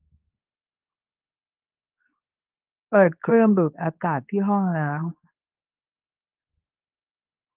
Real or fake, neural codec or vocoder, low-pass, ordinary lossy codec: fake; codec, 24 kHz, 0.9 kbps, WavTokenizer, medium speech release version 2; 3.6 kHz; Opus, 24 kbps